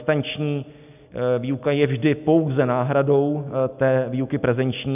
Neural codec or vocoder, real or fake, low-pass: none; real; 3.6 kHz